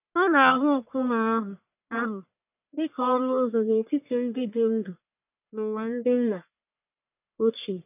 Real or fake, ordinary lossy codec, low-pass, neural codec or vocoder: fake; none; 3.6 kHz; codec, 44.1 kHz, 1.7 kbps, Pupu-Codec